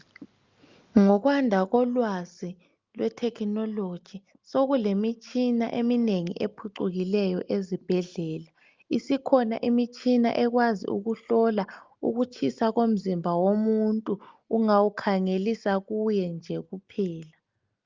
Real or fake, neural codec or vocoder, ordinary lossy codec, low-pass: real; none; Opus, 24 kbps; 7.2 kHz